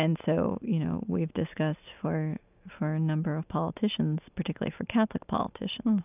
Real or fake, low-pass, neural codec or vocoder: real; 3.6 kHz; none